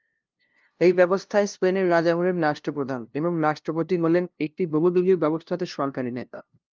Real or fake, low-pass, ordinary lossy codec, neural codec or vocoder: fake; 7.2 kHz; Opus, 32 kbps; codec, 16 kHz, 0.5 kbps, FunCodec, trained on LibriTTS, 25 frames a second